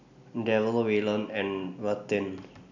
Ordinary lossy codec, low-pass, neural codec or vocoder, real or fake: none; 7.2 kHz; none; real